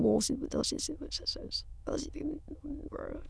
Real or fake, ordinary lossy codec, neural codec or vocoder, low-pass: fake; none; autoencoder, 22.05 kHz, a latent of 192 numbers a frame, VITS, trained on many speakers; none